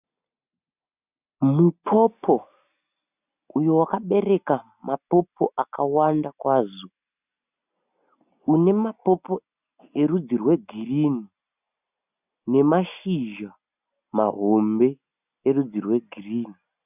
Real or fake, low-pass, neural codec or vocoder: real; 3.6 kHz; none